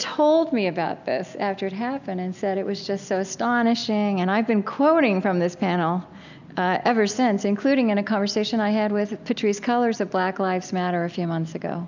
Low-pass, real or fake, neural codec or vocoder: 7.2 kHz; real; none